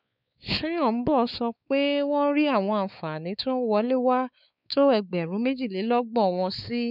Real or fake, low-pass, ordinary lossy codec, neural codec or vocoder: fake; 5.4 kHz; none; codec, 16 kHz, 4 kbps, X-Codec, WavLM features, trained on Multilingual LibriSpeech